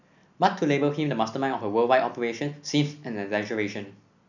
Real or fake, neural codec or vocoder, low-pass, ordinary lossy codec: real; none; 7.2 kHz; none